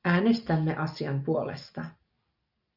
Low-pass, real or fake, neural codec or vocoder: 5.4 kHz; real; none